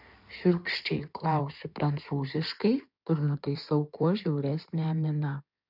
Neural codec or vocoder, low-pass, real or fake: codec, 16 kHz in and 24 kHz out, 2.2 kbps, FireRedTTS-2 codec; 5.4 kHz; fake